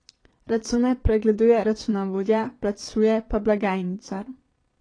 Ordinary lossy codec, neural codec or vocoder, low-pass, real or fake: AAC, 32 kbps; vocoder, 22.05 kHz, 80 mel bands, Vocos; 9.9 kHz; fake